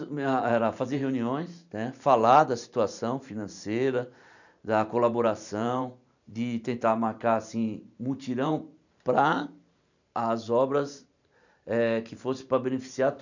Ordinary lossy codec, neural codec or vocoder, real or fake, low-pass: none; vocoder, 44.1 kHz, 128 mel bands every 512 samples, BigVGAN v2; fake; 7.2 kHz